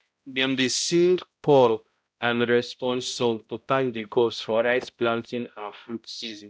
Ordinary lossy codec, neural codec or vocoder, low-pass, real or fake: none; codec, 16 kHz, 0.5 kbps, X-Codec, HuBERT features, trained on balanced general audio; none; fake